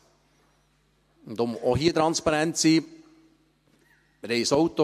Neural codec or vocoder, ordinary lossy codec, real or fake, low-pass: none; MP3, 64 kbps; real; 14.4 kHz